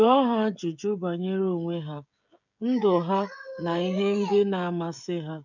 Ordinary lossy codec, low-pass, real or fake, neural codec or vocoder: none; 7.2 kHz; fake; codec, 16 kHz, 16 kbps, FreqCodec, smaller model